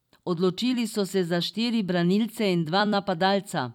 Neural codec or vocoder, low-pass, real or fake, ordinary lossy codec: vocoder, 44.1 kHz, 128 mel bands every 256 samples, BigVGAN v2; 19.8 kHz; fake; none